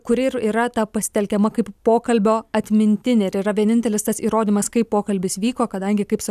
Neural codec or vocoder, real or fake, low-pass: none; real; 14.4 kHz